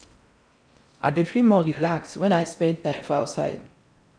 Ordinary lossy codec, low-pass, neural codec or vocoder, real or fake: none; 9.9 kHz; codec, 16 kHz in and 24 kHz out, 0.6 kbps, FocalCodec, streaming, 4096 codes; fake